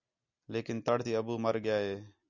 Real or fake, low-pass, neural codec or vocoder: real; 7.2 kHz; none